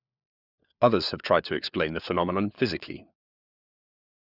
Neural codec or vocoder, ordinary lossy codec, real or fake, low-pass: codec, 16 kHz, 4 kbps, FunCodec, trained on LibriTTS, 50 frames a second; none; fake; 5.4 kHz